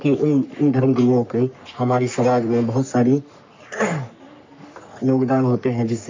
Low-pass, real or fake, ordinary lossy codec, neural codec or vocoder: 7.2 kHz; fake; MP3, 64 kbps; codec, 44.1 kHz, 3.4 kbps, Pupu-Codec